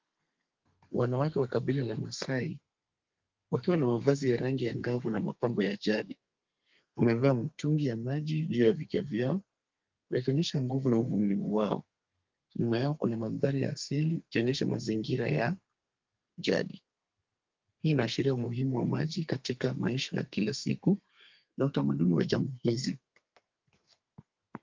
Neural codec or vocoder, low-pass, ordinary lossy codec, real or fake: codec, 32 kHz, 1.9 kbps, SNAC; 7.2 kHz; Opus, 24 kbps; fake